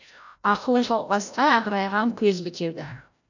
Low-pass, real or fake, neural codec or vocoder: 7.2 kHz; fake; codec, 16 kHz, 0.5 kbps, FreqCodec, larger model